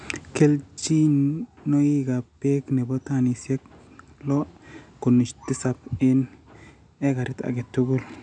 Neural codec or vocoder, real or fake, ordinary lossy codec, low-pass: none; real; none; 9.9 kHz